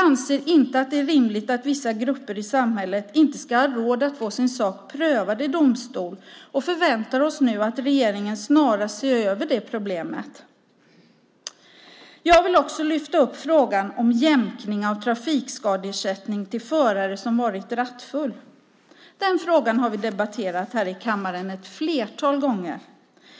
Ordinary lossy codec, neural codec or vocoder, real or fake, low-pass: none; none; real; none